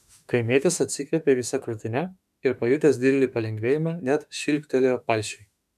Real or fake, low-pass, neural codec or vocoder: fake; 14.4 kHz; autoencoder, 48 kHz, 32 numbers a frame, DAC-VAE, trained on Japanese speech